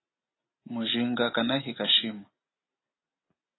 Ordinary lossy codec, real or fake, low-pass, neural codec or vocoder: AAC, 16 kbps; real; 7.2 kHz; none